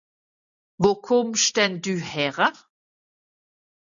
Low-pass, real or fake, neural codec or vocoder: 7.2 kHz; real; none